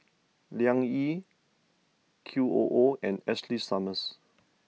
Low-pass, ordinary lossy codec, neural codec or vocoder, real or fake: none; none; none; real